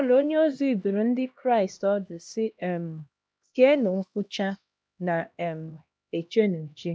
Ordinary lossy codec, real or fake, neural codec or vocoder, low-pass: none; fake; codec, 16 kHz, 1 kbps, X-Codec, HuBERT features, trained on LibriSpeech; none